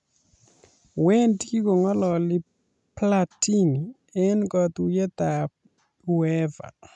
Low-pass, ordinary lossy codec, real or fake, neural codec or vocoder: 10.8 kHz; none; real; none